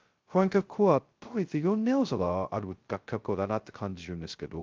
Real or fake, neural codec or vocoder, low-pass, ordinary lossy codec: fake; codec, 16 kHz, 0.2 kbps, FocalCodec; 7.2 kHz; Opus, 32 kbps